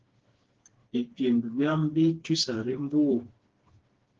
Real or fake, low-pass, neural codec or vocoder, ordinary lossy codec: fake; 7.2 kHz; codec, 16 kHz, 2 kbps, FreqCodec, smaller model; Opus, 16 kbps